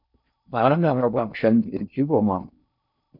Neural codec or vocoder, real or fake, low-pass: codec, 16 kHz in and 24 kHz out, 0.6 kbps, FocalCodec, streaming, 4096 codes; fake; 5.4 kHz